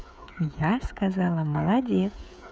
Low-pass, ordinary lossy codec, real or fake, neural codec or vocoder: none; none; fake; codec, 16 kHz, 16 kbps, FreqCodec, smaller model